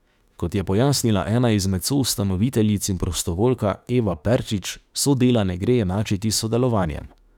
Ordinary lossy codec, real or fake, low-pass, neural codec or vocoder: none; fake; 19.8 kHz; autoencoder, 48 kHz, 32 numbers a frame, DAC-VAE, trained on Japanese speech